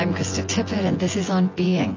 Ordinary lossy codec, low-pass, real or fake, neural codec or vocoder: AAC, 32 kbps; 7.2 kHz; fake; vocoder, 24 kHz, 100 mel bands, Vocos